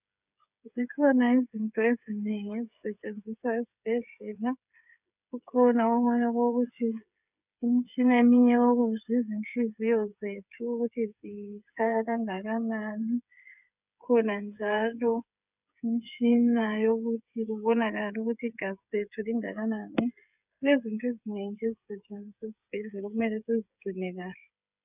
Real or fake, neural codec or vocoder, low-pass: fake; codec, 16 kHz, 8 kbps, FreqCodec, smaller model; 3.6 kHz